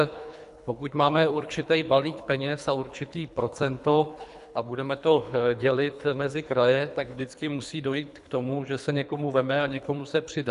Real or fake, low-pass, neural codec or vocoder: fake; 10.8 kHz; codec, 24 kHz, 3 kbps, HILCodec